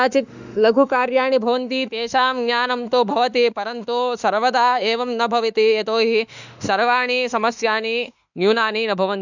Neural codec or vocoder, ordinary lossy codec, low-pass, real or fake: autoencoder, 48 kHz, 32 numbers a frame, DAC-VAE, trained on Japanese speech; none; 7.2 kHz; fake